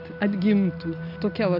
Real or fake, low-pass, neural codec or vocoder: real; 5.4 kHz; none